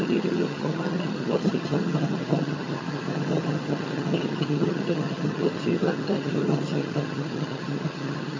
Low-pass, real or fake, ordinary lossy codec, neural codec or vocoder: 7.2 kHz; fake; MP3, 32 kbps; vocoder, 22.05 kHz, 80 mel bands, HiFi-GAN